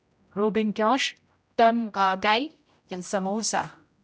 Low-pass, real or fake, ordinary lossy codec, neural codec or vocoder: none; fake; none; codec, 16 kHz, 0.5 kbps, X-Codec, HuBERT features, trained on general audio